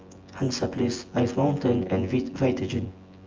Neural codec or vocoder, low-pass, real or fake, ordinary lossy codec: vocoder, 24 kHz, 100 mel bands, Vocos; 7.2 kHz; fake; Opus, 24 kbps